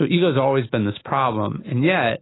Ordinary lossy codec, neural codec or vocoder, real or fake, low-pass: AAC, 16 kbps; autoencoder, 48 kHz, 128 numbers a frame, DAC-VAE, trained on Japanese speech; fake; 7.2 kHz